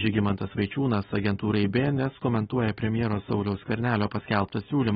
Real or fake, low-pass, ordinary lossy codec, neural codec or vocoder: real; 7.2 kHz; AAC, 16 kbps; none